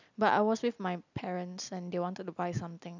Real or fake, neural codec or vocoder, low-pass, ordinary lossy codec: real; none; 7.2 kHz; none